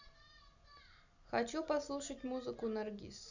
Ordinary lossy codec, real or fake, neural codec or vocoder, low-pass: none; real; none; 7.2 kHz